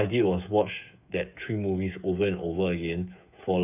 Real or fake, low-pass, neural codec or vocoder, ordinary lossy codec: fake; 3.6 kHz; codec, 16 kHz, 8 kbps, FreqCodec, smaller model; none